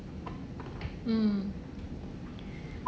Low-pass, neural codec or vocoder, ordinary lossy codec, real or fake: none; none; none; real